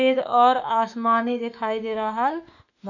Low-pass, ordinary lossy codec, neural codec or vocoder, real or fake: 7.2 kHz; none; autoencoder, 48 kHz, 32 numbers a frame, DAC-VAE, trained on Japanese speech; fake